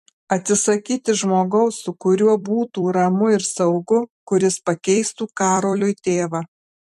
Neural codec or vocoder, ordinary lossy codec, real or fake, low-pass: vocoder, 44.1 kHz, 128 mel bands every 256 samples, BigVGAN v2; MP3, 64 kbps; fake; 14.4 kHz